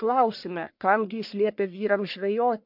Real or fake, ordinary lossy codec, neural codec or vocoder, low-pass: fake; MP3, 48 kbps; codec, 44.1 kHz, 1.7 kbps, Pupu-Codec; 5.4 kHz